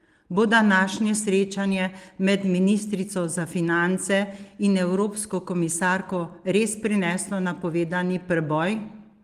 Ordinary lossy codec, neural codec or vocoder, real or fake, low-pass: Opus, 24 kbps; none; real; 14.4 kHz